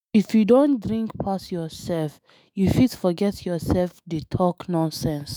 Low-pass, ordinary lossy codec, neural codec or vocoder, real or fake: none; none; autoencoder, 48 kHz, 128 numbers a frame, DAC-VAE, trained on Japanese speech; fake